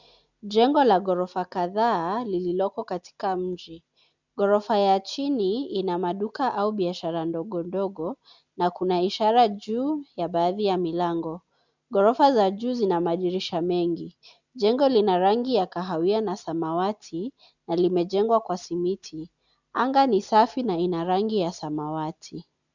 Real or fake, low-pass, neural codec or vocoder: real; 7.2 kHz; none